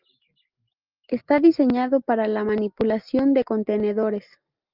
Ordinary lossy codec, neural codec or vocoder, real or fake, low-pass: Opus, 24 kbps; none; real; 5.4 kHz